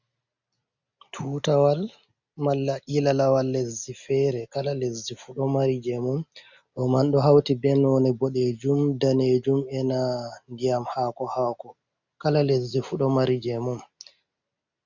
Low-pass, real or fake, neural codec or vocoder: 7.2 kHz; real; none